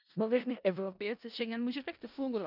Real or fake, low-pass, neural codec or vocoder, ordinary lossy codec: fake; 5.4 kHz; codec, 16 kHz in and 24 kHz out, 0.4 kbps, LongCat-Audio-Codec, four codebook decoder; none